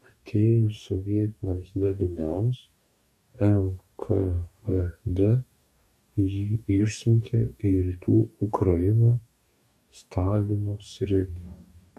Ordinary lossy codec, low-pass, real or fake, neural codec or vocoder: AAC, 96 kbps; 14.4 kHz; fake; codec, 44.1 kHz, 2.6 kbps, DAC